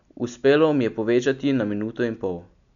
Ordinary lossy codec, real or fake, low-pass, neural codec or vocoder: none; real; 7.2 kHz; none